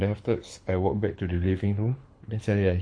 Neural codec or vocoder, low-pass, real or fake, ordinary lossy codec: codec, 24 kHz, 6 kbps, HILCodec; 9.9 kHz; fake; MP3, 48 kbps